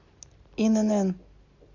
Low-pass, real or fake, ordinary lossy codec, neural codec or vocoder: 7.2 kHz; real; MP3, 48 kbps; none